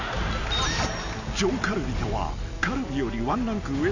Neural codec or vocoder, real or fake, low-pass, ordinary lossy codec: none; real; 7.2 kHz; none